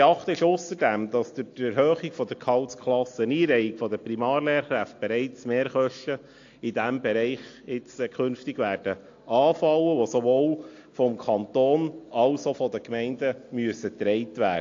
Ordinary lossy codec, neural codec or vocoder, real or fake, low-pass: AAC, 48 kbps; none; real; 7.2 kHz